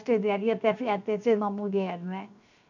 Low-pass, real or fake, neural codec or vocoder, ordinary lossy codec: 7.2 kHz; fake; codec, 16 kHz, 0.7 kbps, FocalCodec; none